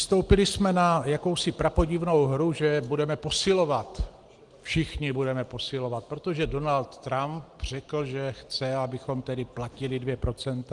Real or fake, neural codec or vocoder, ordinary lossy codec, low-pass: real; none; Opus, 24 kbps; 10.8 kHz